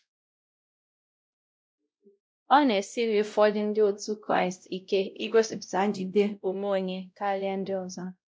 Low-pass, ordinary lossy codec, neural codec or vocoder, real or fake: none; none; codec, 16 kHz, 0.5 kbps, X-Codec, WavLM features, trained on Multilingual LibriSpeech; fake